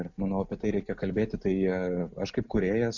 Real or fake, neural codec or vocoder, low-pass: real; none; 7.2 kHz